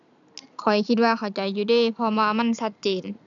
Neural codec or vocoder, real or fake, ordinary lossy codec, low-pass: none; real; none; 7.2 kHz